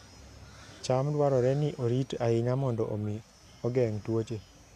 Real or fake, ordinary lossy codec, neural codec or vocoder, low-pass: real; MP3, 96 kbps; none; 14.4 kHz